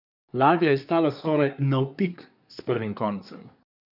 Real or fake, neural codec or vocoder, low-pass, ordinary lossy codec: fake; codec, 24 kHz, 1 kbps, SNAC; 5.4 kHz; none